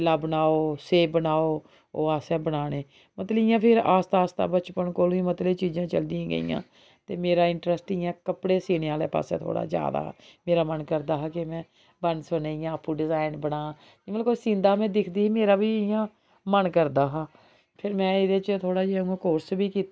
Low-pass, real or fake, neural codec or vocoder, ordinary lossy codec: none; real; none; none